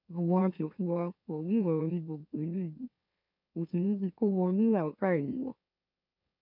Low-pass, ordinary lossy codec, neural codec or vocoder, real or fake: 5.4 kHz; none; autoencoder, 44.1 kHz, a latent of 192 numbers a frame, MeloTTS; fake